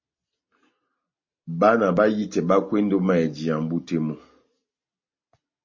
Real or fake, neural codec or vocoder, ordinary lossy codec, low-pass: real; none; MP3, 32 kbps; 7.2 kHz